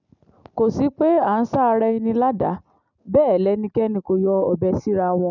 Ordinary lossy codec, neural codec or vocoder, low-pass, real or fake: none; none; 7.2 kHz; real